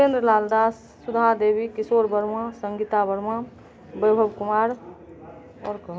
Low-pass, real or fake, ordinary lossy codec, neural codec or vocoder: none; real; none; none